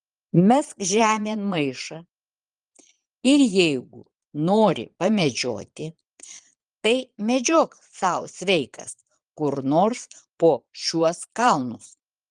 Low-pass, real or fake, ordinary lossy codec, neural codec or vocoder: 9.9 kHz; fake; Opus, 24 kbps; vocoder, 22.05 kHz, 80 mel bands, Vocos